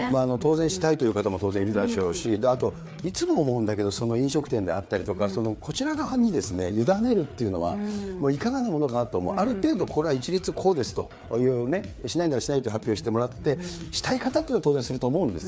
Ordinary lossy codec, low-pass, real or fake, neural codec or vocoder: none; none; fake; codec, 16 kHz, 4 kbps, FreqCodec, larger model